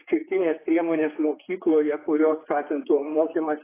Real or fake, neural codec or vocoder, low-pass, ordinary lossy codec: fake; codec, 16 kHz, 4 kbps, X-Codec, HuBERT features, trained on general audio; 3.6 kHz; AAC, 16 kbps